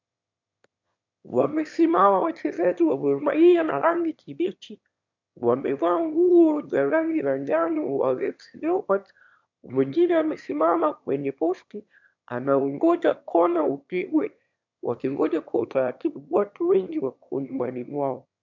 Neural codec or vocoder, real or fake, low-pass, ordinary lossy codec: autoencoder, 22.05 kHz, a latent of 192 numbers a frame, VITS, trained on one speaker; fake; 7.2 kHz; MP3, 64 kbps